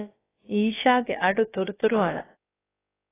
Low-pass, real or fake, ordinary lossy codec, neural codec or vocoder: 3.6 kHz; fake; AAC, 16 kbps; codec, 16 kHz, about 1 kbps, DyCAST, with the encoder's durations